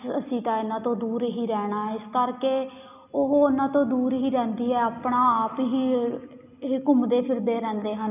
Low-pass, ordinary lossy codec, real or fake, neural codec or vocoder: 3.6 kHz; none; real; none